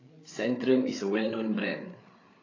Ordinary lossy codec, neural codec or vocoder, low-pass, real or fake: AAC, 32 kbps; codec, 16 kHz, 8 kbps, FreqCodec, larger model; 7.2 kHz; fake